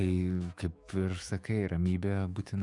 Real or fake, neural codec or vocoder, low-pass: fake; autoencoder, 48 kHz, 128 numbers a frame, DAC-VAE, trained on Japanese speech; 10.8 kHz